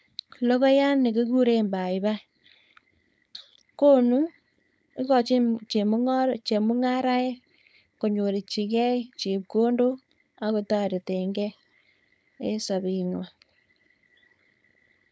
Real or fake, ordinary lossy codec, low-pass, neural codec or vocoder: fake; none; none; codec, 16 kHz, 4.8 kbps, FACodec